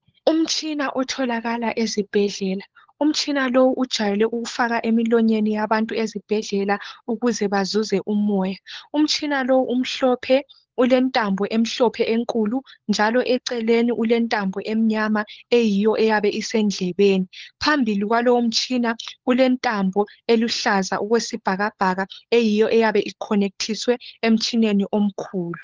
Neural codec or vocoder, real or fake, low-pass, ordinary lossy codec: codec, 16 kHz, 16 kbps, FunCodec, trained on LibriTTS, 50 frames a second; fake; 7.2 kHz; Opus, 16 kbps